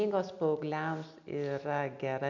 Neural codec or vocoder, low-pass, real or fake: none; 7.2 kHz; real